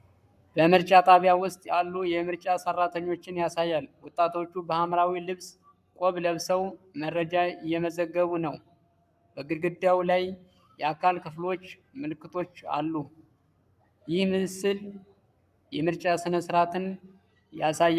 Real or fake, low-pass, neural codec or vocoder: fake; 14.4 kHz; codec, 44.1 kHz, 7.8 kbps, Pupu-Codec